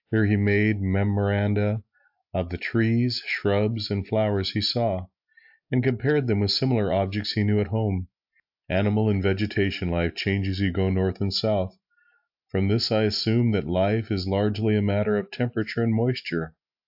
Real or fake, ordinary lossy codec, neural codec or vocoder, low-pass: real; AAC, 48 kbps; none; 5.4 kHz